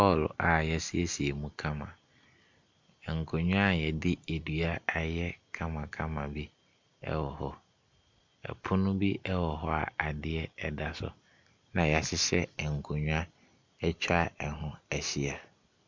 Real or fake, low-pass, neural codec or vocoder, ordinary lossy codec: real; 7.2 kHz; none; MP3, 64 kbps